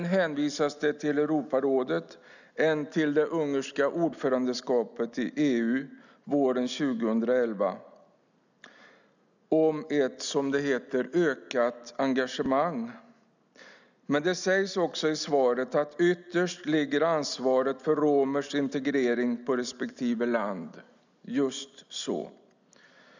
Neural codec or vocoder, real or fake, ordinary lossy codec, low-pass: none; real; none; 7.2 kHz